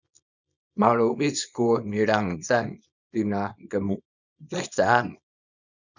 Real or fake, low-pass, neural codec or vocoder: fake; 7.2 kHz; codec, 24 kHz, 0.9 kbps, WavTokenizer, small release